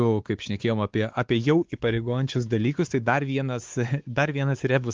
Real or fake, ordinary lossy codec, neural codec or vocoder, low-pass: fake; Opus, 24 kbps; codec, 16 kHz, 4 kbps, X-Codec, WavLM features, trained on Multilingual LibriSpeech; 7.2 kHz